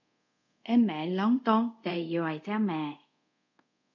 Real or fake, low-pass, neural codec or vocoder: fake; 7.2 kHz; codec, 24 kHz, 0.5 kbps, DualCodec